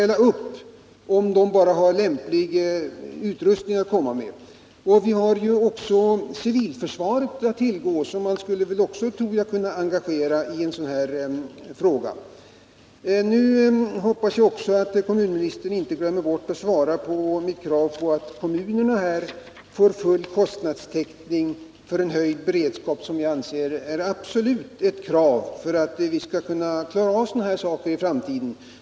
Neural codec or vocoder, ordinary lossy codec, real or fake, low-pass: none; none; real; none